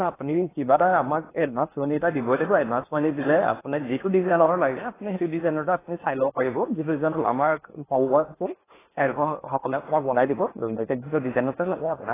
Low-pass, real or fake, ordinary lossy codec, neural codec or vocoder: 3.6 kHz; fake; AAC, 16 kbps; codec, 16 kHz in and 24 kHz out, 0.8 kbps, FocalCodec, streaming, 65536 codes